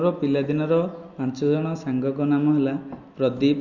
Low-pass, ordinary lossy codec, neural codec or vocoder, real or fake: 7.2 kHz; Opus, 64 kbps; none; real